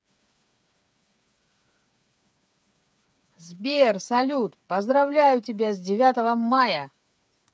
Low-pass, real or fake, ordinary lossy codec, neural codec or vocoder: none; fake; none; codec, 16 kHz, 8 kbps, FreqCodec, smaller model